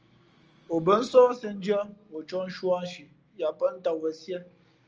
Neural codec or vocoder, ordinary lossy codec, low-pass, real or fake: none; Opus, 24 kbps; 7.2 kHz; real